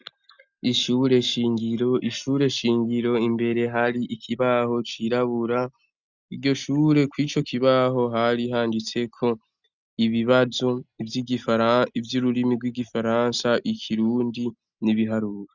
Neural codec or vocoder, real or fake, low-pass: none; real; 7.2 kHz